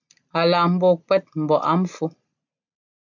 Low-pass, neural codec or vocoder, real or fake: 7.2 kHz; none; real